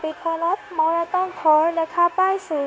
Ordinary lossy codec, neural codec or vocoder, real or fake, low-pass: none; codec, 16 kHz, 0.9 kbps, LongCat-Audio-Codec; fake; none